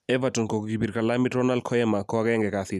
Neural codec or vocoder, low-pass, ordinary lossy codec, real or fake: none; 14.4 kHz; none; real